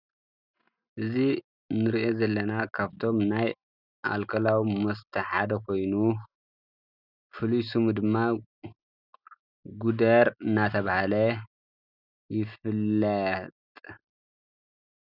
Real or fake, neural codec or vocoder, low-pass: real; none; 5.4 kHz